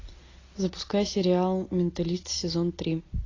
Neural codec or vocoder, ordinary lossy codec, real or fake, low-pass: none; AAC, 32 kbps; real; 7.2 kHz